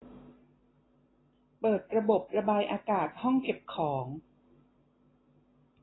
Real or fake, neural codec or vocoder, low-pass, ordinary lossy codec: real; none; 7.2 kHz; AAC, 16 kbps